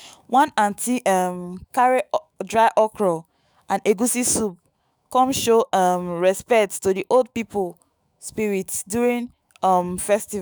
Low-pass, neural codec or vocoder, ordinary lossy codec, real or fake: none; autoencoder, 48 kHz, 128 numbers a frame, DAC-VAE, trained on Japanese speech; none; fake